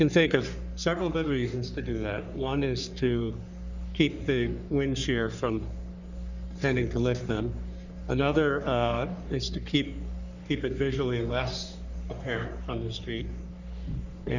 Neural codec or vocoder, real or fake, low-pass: codec, 44.1 kHz, 3.4 kbps, Pupu-Codec; fake; 7.2 kHz